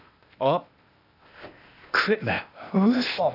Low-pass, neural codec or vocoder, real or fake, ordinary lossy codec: 5.4 kHz; codec, 16 kHz, 0.8 kbps, ZipCodec; fake; none